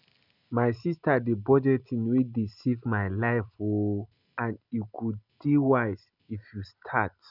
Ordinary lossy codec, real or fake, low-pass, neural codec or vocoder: none; real; 5.4 kHz; none